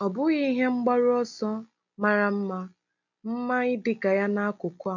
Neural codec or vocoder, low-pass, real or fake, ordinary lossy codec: none; 7.2 kHz; real; none